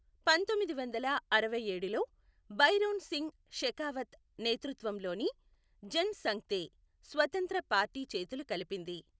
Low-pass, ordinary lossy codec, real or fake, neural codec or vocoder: none; none; real; none